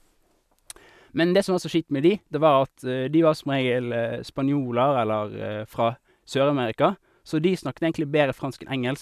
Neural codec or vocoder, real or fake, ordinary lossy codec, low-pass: none; real; none; 14.4 kHz